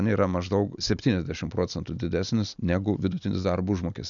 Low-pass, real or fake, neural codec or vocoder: 7.2 kHz; real; none